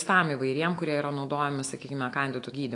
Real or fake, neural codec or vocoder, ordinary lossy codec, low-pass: fake; autoencoder, 48 kHz, 128 numbers a frame, DAC-VAE, trained on Japanese speech; AAC, 48 kbps; 10.8 kHz